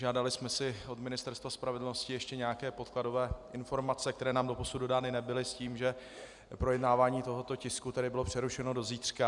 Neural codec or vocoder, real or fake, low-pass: none; real; 10.8 kHz